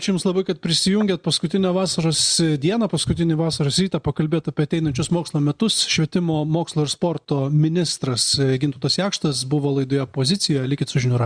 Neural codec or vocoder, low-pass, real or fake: none; 9.9 kHz; real